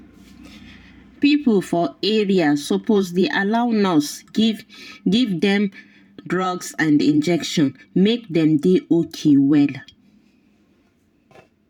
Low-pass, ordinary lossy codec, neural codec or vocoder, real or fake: 19.8 kHz; none; vocoder, 44.1 kHz, 128 mel bands every 512 samples, BigVGAN v2; fake